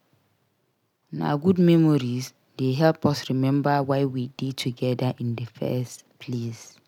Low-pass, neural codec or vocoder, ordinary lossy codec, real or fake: 19.8 kHz; none; none; real